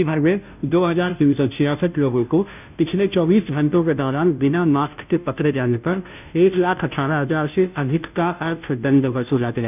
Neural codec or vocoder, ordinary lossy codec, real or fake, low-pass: codec, 16 kHz, 0.5 kbps, FunCodec, trained on Chinese and English, 25 frames a second; none; fake; 3.6 kHz